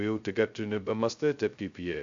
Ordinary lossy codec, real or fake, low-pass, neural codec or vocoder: AAC, 64 kbps; fake; 7.2 kHz; codec, 16 kHz, 0.2 kbps, FocalCodec